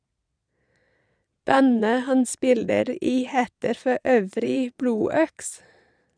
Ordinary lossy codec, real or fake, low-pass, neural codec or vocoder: none; fake; 9.9 kHz; vocoder, 22.05 kHz, 80 mel bands, Vocos